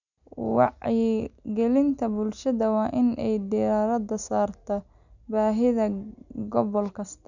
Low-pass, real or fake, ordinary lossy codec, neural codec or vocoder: 7.2 kHz; real; none; none